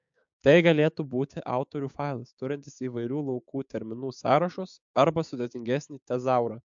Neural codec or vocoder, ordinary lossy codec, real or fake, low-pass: codec, 16 kHz, 6 kbps, DAC; MP3, 64 kbps; fake; 7.2 kHz